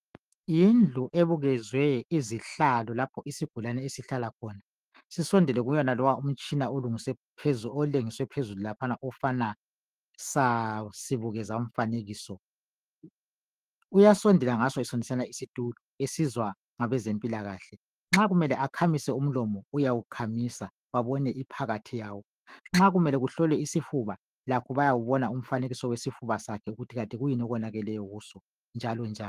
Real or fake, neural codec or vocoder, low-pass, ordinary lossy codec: fake; autoencoder, 48 kHz, 128 numbers a frame, DAC-VAE, trained on Japanese speech; 14.4 kHz; Opus, 24 kbps